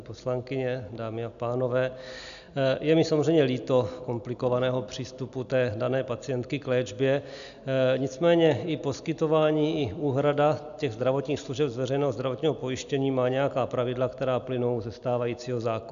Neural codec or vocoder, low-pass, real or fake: none; 7.2 kHz; real